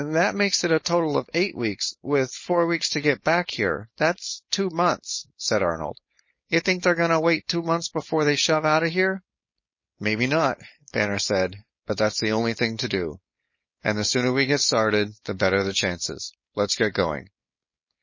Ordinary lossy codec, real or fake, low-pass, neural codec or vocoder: MP3, 32 kbps; fake; 7.2 kHz; codec, 16 kHz, 4.8 kbps, FACodec